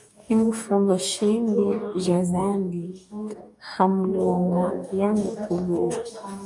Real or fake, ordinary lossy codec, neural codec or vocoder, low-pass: fake; MP3, 96 kbps; codec, 44.1 kHz, 2.6 kbps, DAC; 10.8 kHz